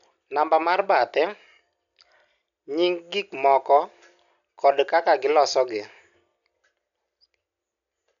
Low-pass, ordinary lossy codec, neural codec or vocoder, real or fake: 7.2 kHz; none; none; real